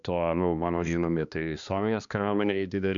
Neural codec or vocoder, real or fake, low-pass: codec, 16 kHz, 2 kbps, X-Codec, HuBERT features, trained on balanced general audio; fake; 7.2 kHz